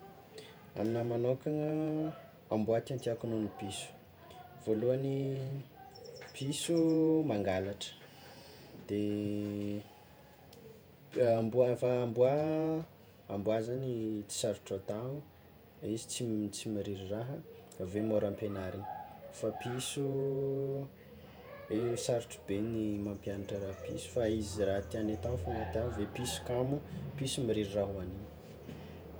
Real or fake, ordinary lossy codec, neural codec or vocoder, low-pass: fake; none; vocoder, 48 kHz, 128 mel bands, Vocos; none